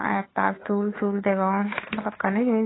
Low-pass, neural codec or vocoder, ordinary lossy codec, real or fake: 7.2 kHz; codec, 16 kHz, 2 kbps, FunCodec, trained on Chinese and English, 25 frames a second; AAC, 16 kbps; fake